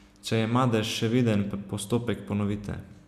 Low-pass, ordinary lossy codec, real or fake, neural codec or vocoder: 14.4 kHz; none; real; none